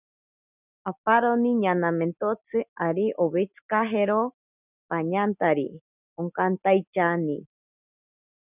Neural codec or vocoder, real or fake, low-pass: none; real; 3.6 kHz